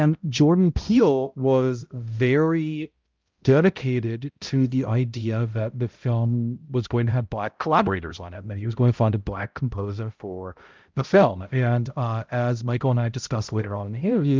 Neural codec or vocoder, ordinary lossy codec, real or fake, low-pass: codec, 16 kHz, 0.5 kbps, X-Codec, HuBERT features, trained on balanced general audio; Opus, 32 kbps; fake; 7.2 kHz